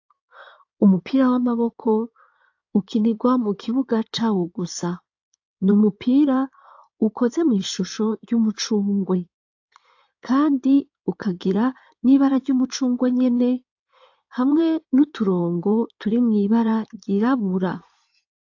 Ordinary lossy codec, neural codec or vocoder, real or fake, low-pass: AAC, 48 kbps; codec, 16 kHz in and 24 kHz out, 2.2 kbps, FireRedTTS-2 codec; fake; 7.2 kHz